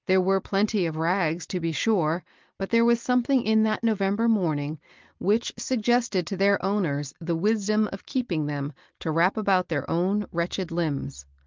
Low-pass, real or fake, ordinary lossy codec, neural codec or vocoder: 7.2 kHz; real; Opus, 24 kbps; none